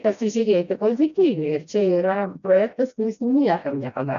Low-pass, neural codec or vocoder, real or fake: 7.2 kHz; codec, 16 kHz, 1 kbps, FreqCodec, smaller model; fake